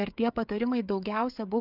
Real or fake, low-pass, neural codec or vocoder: fake; 5.4 kHz; codec, 16 kHz, 8 kbps, FreqCodec, smaller model